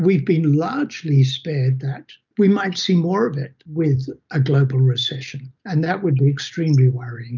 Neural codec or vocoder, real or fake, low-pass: none; real; 7.2 kHz